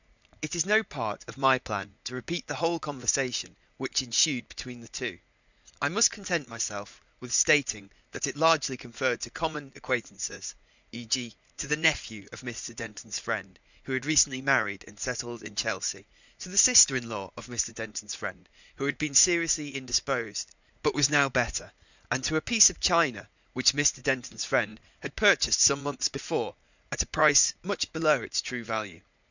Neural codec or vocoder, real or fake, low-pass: vocoder, 44.1 kHz, 80 mel bands, Vocos; fake; 7.2 kHz